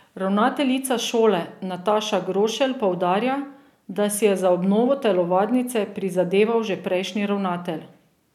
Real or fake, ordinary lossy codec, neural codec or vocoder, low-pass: real; none; none; 19.8 kHz